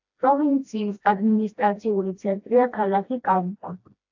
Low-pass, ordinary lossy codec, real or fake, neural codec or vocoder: 7.2 kHz; AAC, 48 kbps; fake; codec, 16 kHz, 1 kbps, FreqCodec, smaller model